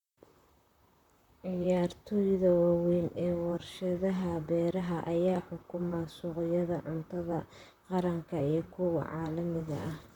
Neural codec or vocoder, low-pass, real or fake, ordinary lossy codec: vocoder, 44.1 kHz, 128 mel bands, Pupu-Vocoder; 19.8 kHz; fake; none